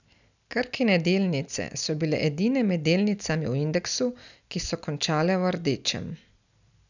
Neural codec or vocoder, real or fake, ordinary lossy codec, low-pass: none; real; none; 7.2 kHz